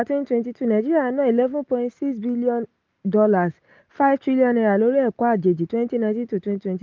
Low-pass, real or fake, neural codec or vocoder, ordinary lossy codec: 7.2 kHz; real; none; Opus, 32 kbps